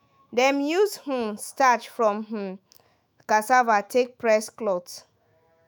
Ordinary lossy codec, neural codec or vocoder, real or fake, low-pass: none; autoencoder, 48 kHz, 128 numbers a frame, DAC-VAE, trained on Japanese speech; fake; none